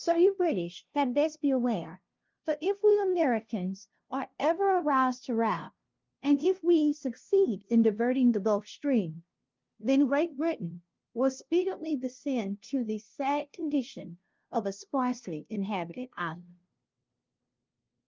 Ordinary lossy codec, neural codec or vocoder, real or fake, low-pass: Opus, 32 kbps; codec, 16 kHz, 0.5 kbps, FunCodec, trained on LibriTTS, 25 frames a second; fake; 7.2 kHz